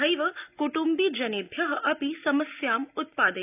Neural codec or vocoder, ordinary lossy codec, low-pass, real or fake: none; none; 3.6 kHz; real